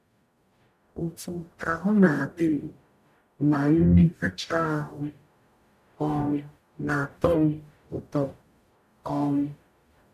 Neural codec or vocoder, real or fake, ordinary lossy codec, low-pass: codec, 44.1 kHz, 0.9 kbps, DAC; fake; none; 14.4 kHz